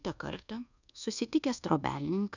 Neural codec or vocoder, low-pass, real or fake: codec, 24 kHz, 1.2 kbps, DualCodec; 7.2 kHz; fake